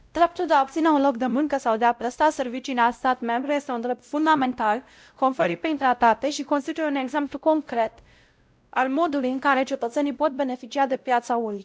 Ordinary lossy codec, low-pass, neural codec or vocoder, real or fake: none; none; codec, 16 kHz, 0.5 kbps, X-Codec, WavLM features, trained on Multilingual LibriSpeech; fake